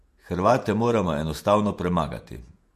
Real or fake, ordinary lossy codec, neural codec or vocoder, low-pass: fake; MP3, 64 kbps; vocoder, 48 kHz, 128 mel bands, Vocos; 14.4 kHz